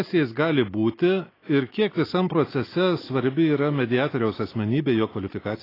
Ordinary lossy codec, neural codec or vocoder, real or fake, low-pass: AAC, 24 kbps; none; real; 5.4 kHz